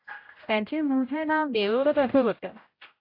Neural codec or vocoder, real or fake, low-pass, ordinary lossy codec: codec, 16 kHz, 0.5 kbps, X-Codec, HuBERT features, trained on general audio; fake; 5.4 kHz; AAC, 32 kbps